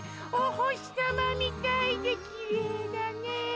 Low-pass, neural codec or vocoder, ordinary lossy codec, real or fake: none; none; none; real